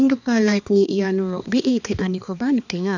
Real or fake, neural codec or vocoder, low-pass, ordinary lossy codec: fake; codec, 16 kHz, 2 kbps, X-Codec, HuBERT features, trained on balanced general audio; 7.2 kHz; none